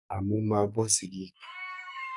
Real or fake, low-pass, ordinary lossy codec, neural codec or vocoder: fake; 10.8 kHz; none; codec, 44.1 kHz, 2.6 kbps, SNAC